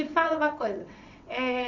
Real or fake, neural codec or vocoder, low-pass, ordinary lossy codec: fake; vocoder, 22.05 kHz, 80 mel bands, WaveNeXt; 7.2 kHz; none